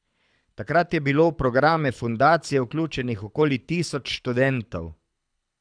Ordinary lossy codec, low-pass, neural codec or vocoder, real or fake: none; 9.9 kHz; codec, 24 kHz, 6 kbps, HILCodec; fake